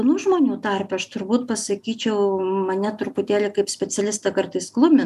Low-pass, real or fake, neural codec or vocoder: 14.4 kHz; real; none